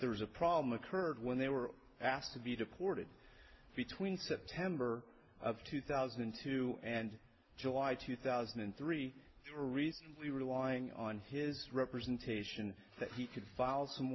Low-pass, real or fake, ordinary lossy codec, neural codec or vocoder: 7.2 kHz; real; MP3, 24 kbps; none